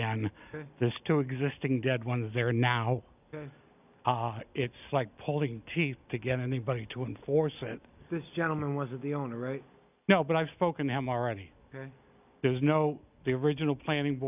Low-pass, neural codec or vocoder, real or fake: 3.6 kHz; none; real